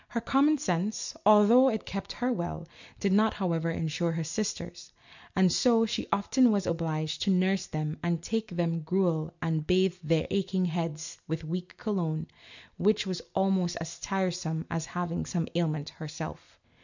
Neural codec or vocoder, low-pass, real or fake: none; 7.2 kHz; real